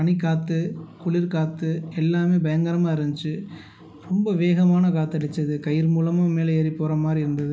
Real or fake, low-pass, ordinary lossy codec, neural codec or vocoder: real; none; none; none